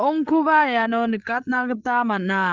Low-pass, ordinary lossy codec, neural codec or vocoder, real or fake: 7.2 kHz; Opus, 24 kbps; codec, 16 kHz, 16 kbps, FreqCodec, larger model; fake